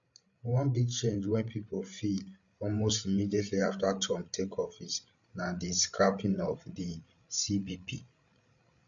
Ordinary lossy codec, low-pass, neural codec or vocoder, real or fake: none; 7.2 kHz; codec, 16 kHz, 16 kbps, FreqCodec, larger model; fake